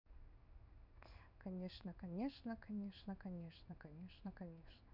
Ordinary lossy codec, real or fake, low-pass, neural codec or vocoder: none; fake; 5.4 kHz; codec, 16 kHz in and 24 kHz out, 1 kbps, XY-Tokenizer